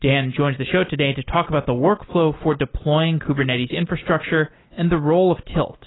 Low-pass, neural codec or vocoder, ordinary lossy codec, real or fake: 7.2 kHz; none; AAC, 16 kbps; real